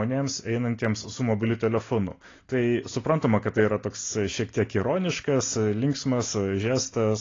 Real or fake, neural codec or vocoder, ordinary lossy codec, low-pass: real; none; AAC, 32 kbps; 7.2 kHz